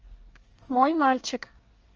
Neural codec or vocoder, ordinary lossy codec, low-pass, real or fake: codec, 24 kHz, 1 kbps, SNAC; Opus, 24 kbps; 7.2 kHz; fake